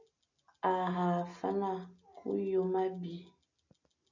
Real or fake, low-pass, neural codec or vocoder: real; 7.2 kHz; none